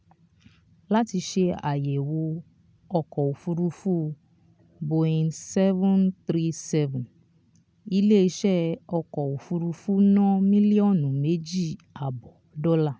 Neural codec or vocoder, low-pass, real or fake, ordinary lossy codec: none; none; real; none